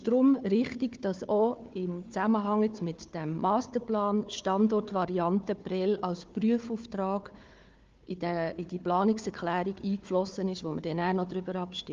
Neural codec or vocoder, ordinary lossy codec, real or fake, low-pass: codec, 16 kHz, 4 kbps, FunCodec, trained on Chinese and English, 50 frames a second; Opus, 32 kbps; fake; 7.2 kHz